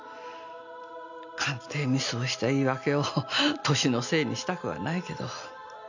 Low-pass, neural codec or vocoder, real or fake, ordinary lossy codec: 7.2 kHz; none; real; none